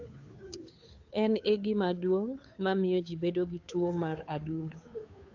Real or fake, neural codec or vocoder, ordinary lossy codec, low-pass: fake; codec, 16 kHz, 2 kbps, FunCodec, trained on Chinese and English, 25 frames a second; AAC, 48 kbps; 7.2 kHz